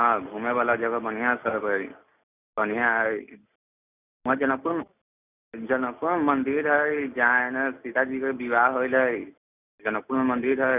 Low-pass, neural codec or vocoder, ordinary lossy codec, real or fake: 3.6 kHz; none; none; real